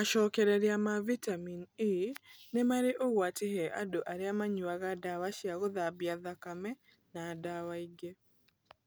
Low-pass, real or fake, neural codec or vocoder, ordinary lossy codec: none; real; none; none